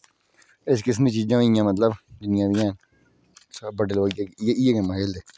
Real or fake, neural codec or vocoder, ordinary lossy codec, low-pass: real; none; none; none